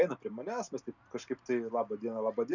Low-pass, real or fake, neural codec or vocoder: 7.2 kHz; real; none